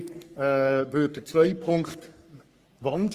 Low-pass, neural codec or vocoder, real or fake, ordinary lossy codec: 14.4 kHz; codec, 44.1 kHz, 3.4 kbps, Pupu-Codec; fake; Opus, 32 kbps